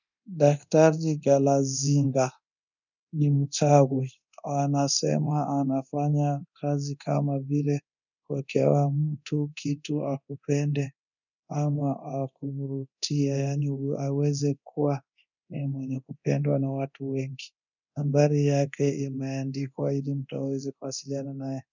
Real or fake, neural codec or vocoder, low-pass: fake; codec, 24 kHz, 0.9 kbps, DualCodec; 7.2 kHz